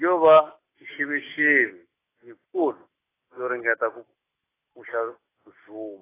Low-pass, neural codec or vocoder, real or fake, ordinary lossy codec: 3.6 kHz; none; real; AAC, 16 kbps